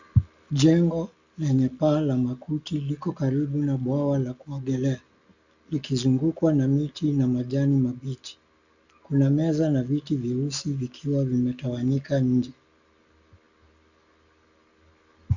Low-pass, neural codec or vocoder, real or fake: 7.2 kHz; none; real